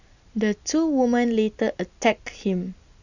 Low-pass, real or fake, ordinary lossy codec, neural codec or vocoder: 7.2 kHz; real; none; none